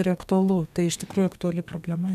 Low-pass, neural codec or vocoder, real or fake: 14.4 kHz; codec, 32 kHz, 1.9 kbps, SNAC; fake